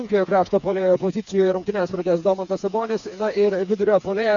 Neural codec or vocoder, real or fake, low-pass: codec, 16 kHz, 4 kbps, FreqCodec, smaller model; fake; 7.2 kHz